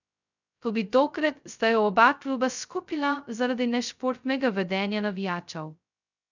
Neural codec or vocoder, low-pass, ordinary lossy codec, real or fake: codec, 16 kHz, 0.2 kbps, FocalCodec; 7.2 kHz; none; fake